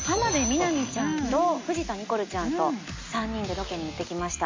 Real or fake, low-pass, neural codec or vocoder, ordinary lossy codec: real; 7.2 kHz; none; MP3, 48 kbps